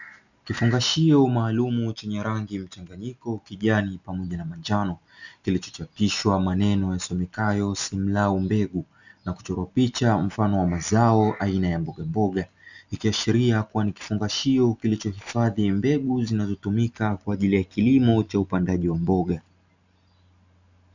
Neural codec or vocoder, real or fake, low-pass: none; real; 7.2 kHz